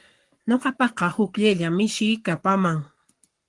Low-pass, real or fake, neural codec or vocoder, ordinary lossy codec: 10.8 kHz; fake; codec, 44.1 kHz, 7.8 kbps, Pupu-Codec; Opus, 24 kbps